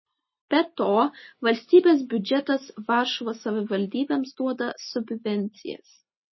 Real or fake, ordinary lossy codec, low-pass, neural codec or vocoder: real; MP3, 24 kbps; 7.2 kHz; none